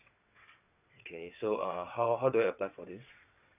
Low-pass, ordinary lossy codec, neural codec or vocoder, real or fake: 3.6 kHz; none; vocoder, 22.05 kHz, 80 mel bands, WaveNeXt; fake